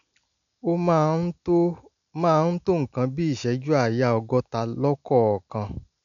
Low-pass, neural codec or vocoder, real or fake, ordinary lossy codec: 7.2 kHz; none; real; none